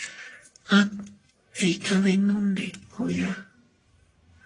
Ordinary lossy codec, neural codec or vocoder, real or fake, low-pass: AAC, 32 kbps; codec, 44.1 kHz, 1.7 kbps, Pupu-Codec; fake; 10.8 kHz